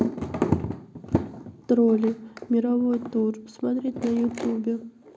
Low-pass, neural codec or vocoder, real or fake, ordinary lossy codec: none; none; real; none